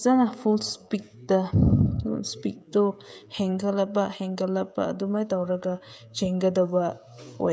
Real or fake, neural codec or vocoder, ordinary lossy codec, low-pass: fake; codec, 16 kHz, 16 kbps, FreqCodec, smaller model; none; none